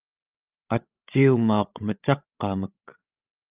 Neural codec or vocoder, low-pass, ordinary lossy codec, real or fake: codec, 16 kHz, 16 kbps, FreqCodec, larger model; 3.6 kHz; Opus, 24 kbps; fake